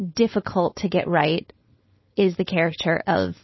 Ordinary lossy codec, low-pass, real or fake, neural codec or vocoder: MP3, 24 kbps; 7.2 kHz; fake; codec, 16 kHz, 4.8 kbps, FACodec